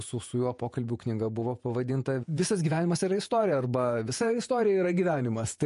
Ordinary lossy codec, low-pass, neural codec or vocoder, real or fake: MP3, 48 kbps; 14.4 kHz; vocoder, 48 kHz, 128 mel bands, Vocos; fake